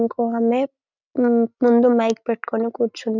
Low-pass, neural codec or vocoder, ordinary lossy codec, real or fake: 7.2 kHz; none; none; real